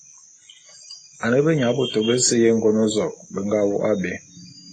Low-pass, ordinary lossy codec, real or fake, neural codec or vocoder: 9.9 kHz; AAC, 32 kbps; real; none